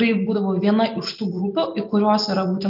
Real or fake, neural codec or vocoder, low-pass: real; none; 5.4 kHz